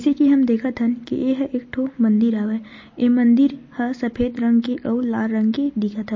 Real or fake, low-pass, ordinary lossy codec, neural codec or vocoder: real; 7.2 kHz; MP3, 32 kbps; none